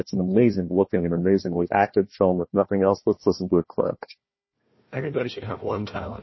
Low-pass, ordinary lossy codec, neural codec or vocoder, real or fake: 7.2 kHz; MP3, 24 kbps; codec, 16 kHz, 1 kbps, FunCodec, trained on Chinese and English, 50 frames a second; fake